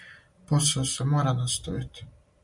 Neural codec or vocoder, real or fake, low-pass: none; real; 10.8 kHz